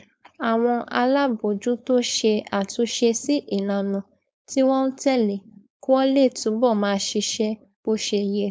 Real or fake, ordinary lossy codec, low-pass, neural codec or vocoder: fake; none; none; codec, 16 kHz, 4.8 kbps, FACodec